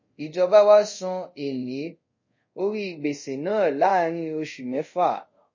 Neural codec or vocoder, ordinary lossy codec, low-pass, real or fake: codec, 24 kHz, 0.5 kbps, DualCodec; MP3, 32 kbps; 7.2 kHz; fake